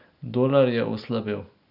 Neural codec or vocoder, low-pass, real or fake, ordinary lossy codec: none; 5.4 kHz; real; none